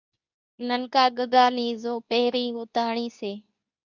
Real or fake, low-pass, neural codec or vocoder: fake; 7.2 kHz; codec, 24 kHz, 0.9 kbps, WavTokenizer, medium speech release version 2